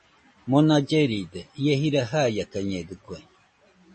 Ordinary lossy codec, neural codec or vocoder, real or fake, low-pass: MP3, 32 kbps; none; real; 10.8 kHz